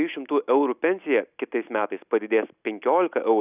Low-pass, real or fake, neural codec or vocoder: 3.6 kHz; real; none